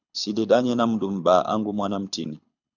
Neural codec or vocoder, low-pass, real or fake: codec, 24 kHz, 6 kbps, HILCodec; 7.2 kHz; fake